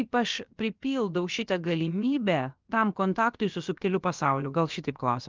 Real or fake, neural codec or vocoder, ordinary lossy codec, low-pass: fake; codec, 16 kHz, about 1 kbps, DyCAST, with the encoder's durations; Opus, 32 kbps; 7.2 kHz